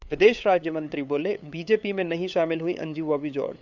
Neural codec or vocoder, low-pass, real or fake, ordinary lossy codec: codec, 16 kHz, 8 kbps, FunCodec, trained on LibriTTS, 25 frames a second; 7.2 kHz; fake; Opus, 64 kbps